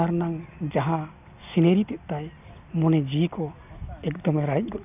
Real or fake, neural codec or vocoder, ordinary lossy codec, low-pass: real; none; none; 3.6 kHz